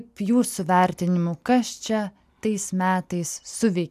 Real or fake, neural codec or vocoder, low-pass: real; none; 14.4 kHz